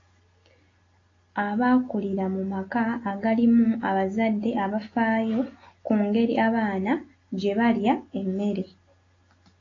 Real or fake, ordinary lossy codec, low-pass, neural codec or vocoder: real; AAC, 32 kbps; 7.2 kHz; none